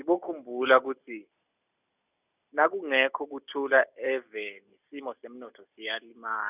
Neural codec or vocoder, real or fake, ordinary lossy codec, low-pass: none; real; none; 3.6 kHz